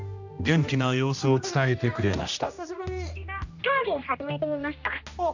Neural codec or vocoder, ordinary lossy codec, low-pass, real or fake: codec, 16 kHz, 1 kbps, X-Codec, HuBERT features, trained on general audio; none; 7.2 kHz; fake